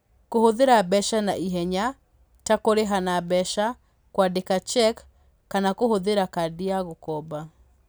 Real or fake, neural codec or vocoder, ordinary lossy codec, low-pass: real; none; none; none